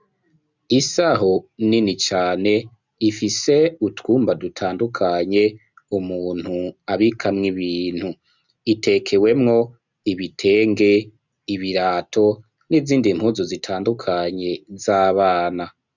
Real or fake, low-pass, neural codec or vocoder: real; 7.2 kHz; none